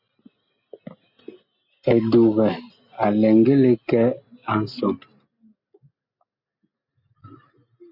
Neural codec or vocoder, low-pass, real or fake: none; 5.4 kHz; real